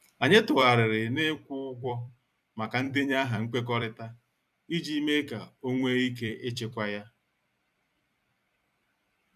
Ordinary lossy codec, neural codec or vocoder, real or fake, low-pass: none; vocoder, 44.1 kHz, 128 mel bands every 256 samples, BigVGAN v2; fake; 14.4 kHz